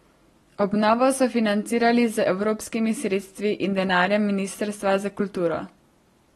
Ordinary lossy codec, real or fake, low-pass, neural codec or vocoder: AAC, 32 kbps; real; 19.8 kHz; none